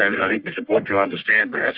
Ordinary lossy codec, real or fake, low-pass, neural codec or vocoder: Opus, 64 kbps; fake; 5.4 kHz; codec, 44.1 kHz, 1.7 kbps, Pupu-Codec